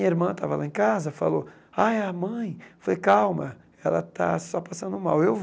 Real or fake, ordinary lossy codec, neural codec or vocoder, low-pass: real; none; none; none